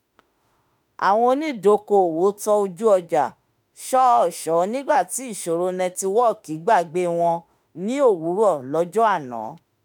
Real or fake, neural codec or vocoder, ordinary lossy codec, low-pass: fake; autoencoder, 48 kHz, 32 numbers a frame, DAC-VAE, trained on Japanese speech; none; none